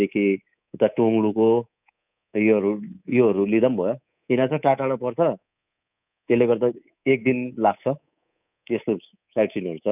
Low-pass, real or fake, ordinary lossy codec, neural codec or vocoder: 3.6 kHz; fake; none; codec, 24 kHz, 3.1 kbps, DualCodec